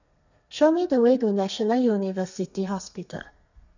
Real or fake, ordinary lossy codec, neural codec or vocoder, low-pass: fake; none; codec, 32 kHz, 1.9 kbps, SNAC; 7.2 kHz